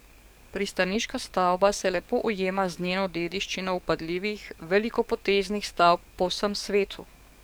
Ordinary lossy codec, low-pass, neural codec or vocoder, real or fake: none; none; codec, 44.1 kHz, 7.8 kbps, DAC; fake